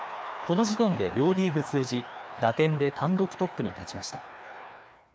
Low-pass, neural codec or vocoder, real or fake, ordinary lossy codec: none; codec, 16 kHz, 2 kbps, FreqCodec, larger model; fake; none